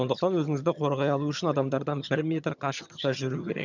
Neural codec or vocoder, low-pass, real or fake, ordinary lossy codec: vocoder, 22.05 kHz, 80 mel bands, HiFi-GAN; 7.2 kHz; fake; none